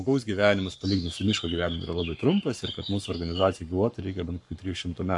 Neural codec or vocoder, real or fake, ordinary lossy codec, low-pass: codec, 44.1 kHz, 7.8 kbps, Pupu-Codec; fake; AAC, 64 kbps; 10.8 kHz